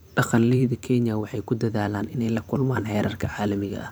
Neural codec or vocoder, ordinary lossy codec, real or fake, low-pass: vocoder, 44.1 kHz, 128 mel bands every 512 samples, BigVGAN v2; none; fake; none